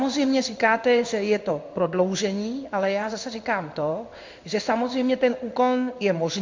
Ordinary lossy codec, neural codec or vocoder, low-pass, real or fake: MP3, 64 kbps; codec, 16 kHz in and 24 kHz out, 1 kbps, XY-Tokenizer; 7.2 kHz; fake